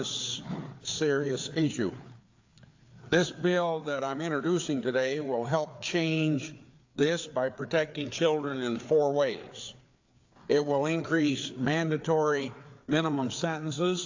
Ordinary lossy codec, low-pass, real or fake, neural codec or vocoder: AAC, 48 kbps; 7.2 kHz; fake; codec, 16 kHz, 4 kbps, FreqCodec, larger model